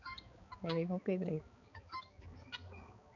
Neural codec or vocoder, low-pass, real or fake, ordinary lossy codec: codec, 16 kHz, 4 kbps, X-Codec, HuBERT features, trained on balanced general audio; 7.2 kHz; fake; none